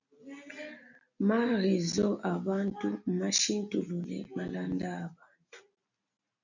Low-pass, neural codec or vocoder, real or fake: 7.2 kHz; none; real